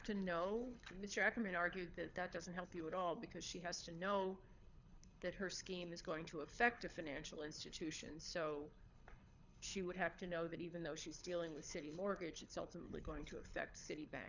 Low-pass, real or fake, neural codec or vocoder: 7.2 kHz; fake; codec, 24 kHz, 6 kbps, HILCodec